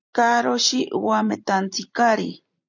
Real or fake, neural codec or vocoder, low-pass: fake; vocoder, 44.1 kHz, 128 mel bands every 256 samples, BigVGAN v2; 7.2 kHz